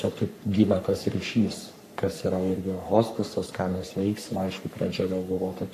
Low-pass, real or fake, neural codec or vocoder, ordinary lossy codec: 14.4 kHz; fake; codec, 44.1 kHz, 3.4 kbps, Pupu-Codec; AAC, 64 kbps